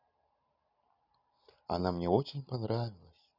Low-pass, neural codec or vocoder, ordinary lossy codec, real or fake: 5.4 kHz; none; none; real